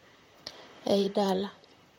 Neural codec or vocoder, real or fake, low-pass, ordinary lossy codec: vocoder, 44.1 kHz, 128 mel bands, Pupu-Vocoder; fake; 19.8 kHz; MP3, 64 kbps